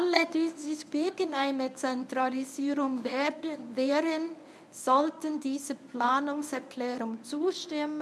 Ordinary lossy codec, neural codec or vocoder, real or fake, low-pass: none; codec, 24 kHz, 0.9 kbps, WavTokenizer, medium speech release version 1; fake; none